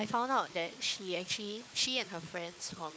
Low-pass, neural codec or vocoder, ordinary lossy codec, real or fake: none; codec, 16 kHz, 4 kbps, FunCodec, trained on Chinese and English, 50 frames a second; none; fake